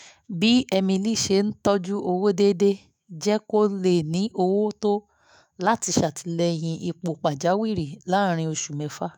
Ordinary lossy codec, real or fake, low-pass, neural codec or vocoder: none; fake; none; autoencoder, 48 kHz, 128 numbers a frame, DAC-VAE, trained on Japanese speech